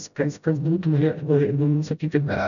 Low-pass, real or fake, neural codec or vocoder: 7.2 kHz; fake; codec, 16 kHz, 0.5 kbps, FreqCodec, smaller model